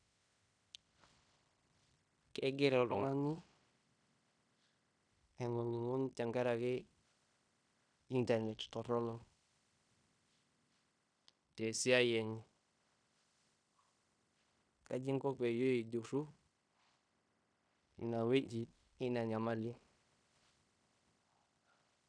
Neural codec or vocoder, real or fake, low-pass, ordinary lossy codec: codec, 16 kHz in and 24 kHz out, 0.9 kbps, LongCat-Audio-Codec, fine tuned four codebook decoder; fake; 9.9 kHz; none